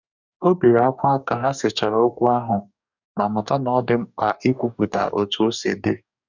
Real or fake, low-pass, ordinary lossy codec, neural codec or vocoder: fake; 7.2 kHz; none; codec, 44.1 kHz, 2.6 kbps, DAC